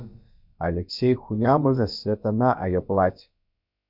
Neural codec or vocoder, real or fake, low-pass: codec, 16 kHz, about 1 kbps, DyCAST, with the encoder's durations; fake; 5.4 kHz